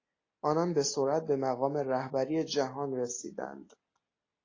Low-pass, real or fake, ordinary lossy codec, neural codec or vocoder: 7.2 kHz; real; AAC, 32 kbps; none